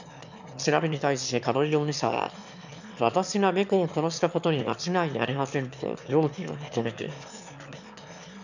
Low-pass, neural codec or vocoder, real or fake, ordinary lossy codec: 7.2 kHz; autoencoder, 22.05 kHz, a latent of 192 numbers a frame, VITS, trained on one speaker; fake; none